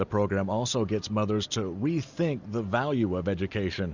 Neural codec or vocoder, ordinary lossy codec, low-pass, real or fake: none; Opus, 64 kbps; 7.2 kHz; real